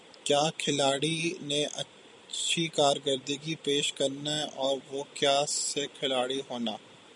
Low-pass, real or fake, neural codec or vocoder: 10.8 kHz; fake; vocoder, 44.1 kHz, 128 mel bands every 256 samples, BigVGAN v2